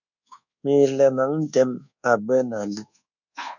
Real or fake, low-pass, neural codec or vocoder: fake; 7.2 kHz; codec, 24 kHz, 1.2 kbps, DualCodec